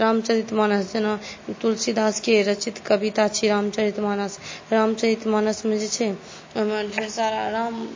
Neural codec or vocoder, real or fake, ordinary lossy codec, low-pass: none; real; MP3, 32 kbps; 7.2 kHz